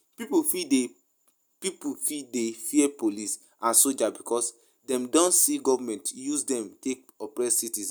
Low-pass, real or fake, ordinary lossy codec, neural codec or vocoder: none; real; none; none